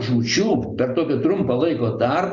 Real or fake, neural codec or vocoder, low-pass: real; none; 7.2 kHz